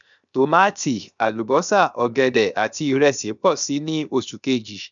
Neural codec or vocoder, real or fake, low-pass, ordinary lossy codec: codec, 16 kHz, 0.7 kbps, FocalCodec; fake; 7.2 kHz; none